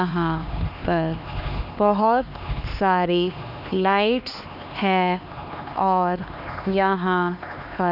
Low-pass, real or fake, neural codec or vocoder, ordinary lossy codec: 5.4 kHz; fake; codec, 16 kHz, 2 kbps, X-Codec, HuBERT features, trained on LibriSpeech; none